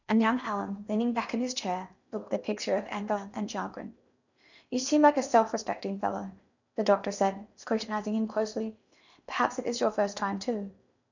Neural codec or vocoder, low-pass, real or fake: codec, 16 kHz in and 24 kHz out, 0.8 kbps, FocalCodec, streaming, 65536 codes; 7.2 kHz; fake